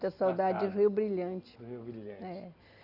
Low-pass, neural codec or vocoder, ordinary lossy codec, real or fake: 5.4 kHz; none; none; real